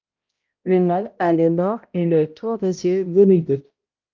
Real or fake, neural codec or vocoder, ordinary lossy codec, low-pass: fake; codec, 16 kHz, 0.5 kbps, X-Codec, HuBERT features, trained on balanced general audio; Opus, 32 kbps; 7.2 kHz